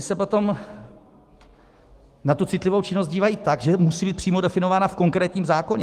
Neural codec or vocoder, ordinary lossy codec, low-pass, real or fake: autoencoder, 48 kHz, 128 numbers a frame, DAC-VAE, trained on Japanese speech; Opus, 24 kbps; 14.4 kHz; fake